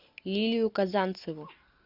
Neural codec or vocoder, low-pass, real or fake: none; 5.4 kHz; real